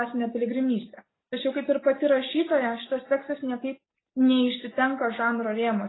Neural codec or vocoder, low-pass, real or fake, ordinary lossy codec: none; 7.2 kHz; real; AAC, 16 kbps